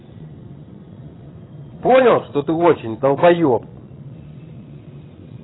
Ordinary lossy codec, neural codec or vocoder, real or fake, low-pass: AAC, 16 kbps; vocoder, 22.05 kHz, 80 mel bands, WaveNeXt; fake; 7.2 kHz